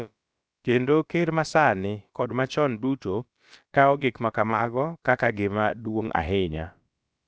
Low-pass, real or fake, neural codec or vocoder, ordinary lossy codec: none; fake; codec, 16 kHz, about 1 kbps, DyCAST, with the encoder's durations; none